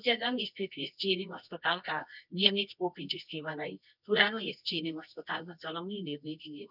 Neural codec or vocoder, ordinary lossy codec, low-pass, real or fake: codec, 24 kHz, 0.9 kbps, WavTokenizer, medium music audio release; none; 5.4 kHz; fake